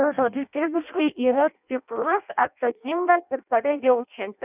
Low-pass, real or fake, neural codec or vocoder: 3.6 kHz; fake; codec, 16 kHz in and 24 kHz out, 0.6 kbps, FireRedTTS-2 codec